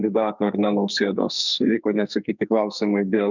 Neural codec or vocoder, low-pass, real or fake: codec, 44.1 kHz, 2.6 kbps, SNAC; 7.2 kHz; fake